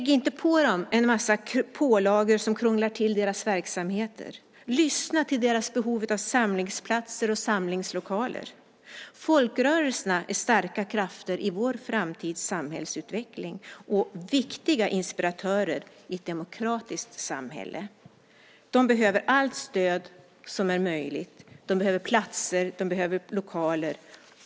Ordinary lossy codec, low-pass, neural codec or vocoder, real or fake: none; none; none; real